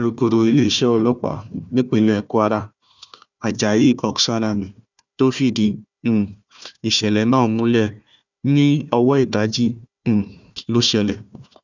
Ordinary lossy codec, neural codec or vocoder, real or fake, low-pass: none; codec, 16 kHz, 1 kbps, FunCodec, trained on Chinese and English, 50 frames a second; fake; 7.2 kHz